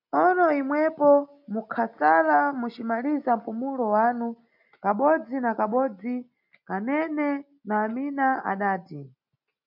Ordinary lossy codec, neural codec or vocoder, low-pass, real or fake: MP3, 48 kbps; none; 5.4 kHz; real